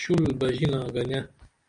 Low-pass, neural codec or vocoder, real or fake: 9.9 kHz; none; real